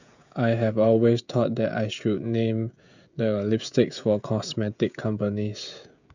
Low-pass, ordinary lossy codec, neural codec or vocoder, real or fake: 7.2 kHz; none; codec, 16 kHz, 16 kbps, FreqCodec, smaller model; fake